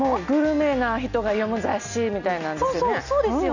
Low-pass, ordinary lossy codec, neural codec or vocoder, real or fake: 7.2 kHz; none; none; real